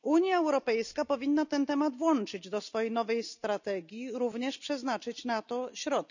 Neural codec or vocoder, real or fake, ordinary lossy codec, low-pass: none; real; none; 7.2 kHz